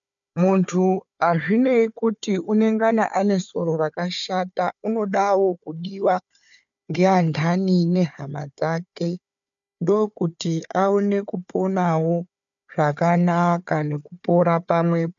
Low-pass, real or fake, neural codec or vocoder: 7.2 kHz; fake; codec, 16 kHz, 4 kbps, FunCodec, trained on Chinese and English, 50 frames a second